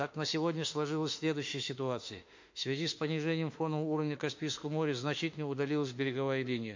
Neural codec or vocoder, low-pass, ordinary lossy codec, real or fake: autoencoder, 48 kHz, 32 numbers a frame, DAC-VAE, trained on Japanese speech; 7.2 kHz; MP3, 48 kbps; fake